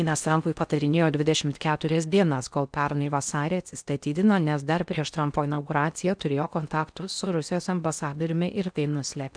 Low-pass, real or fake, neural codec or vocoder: 9.9 kHz; fake; codec, 16 kHz in and 24 kHz out, 0.6 kbps, FocalCodec, streaming, 4096 codes